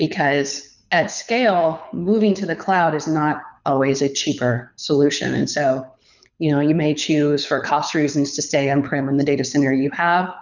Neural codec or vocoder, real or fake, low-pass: codec, 24 kHz, 6 kbps, HILCodec; fake; 7.2 kHz